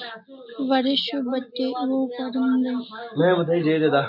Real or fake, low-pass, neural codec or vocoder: real; 5.4 kHz; none